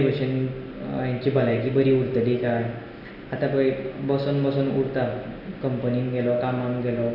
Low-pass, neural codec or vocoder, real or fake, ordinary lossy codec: 5.4 kHz; none; real; none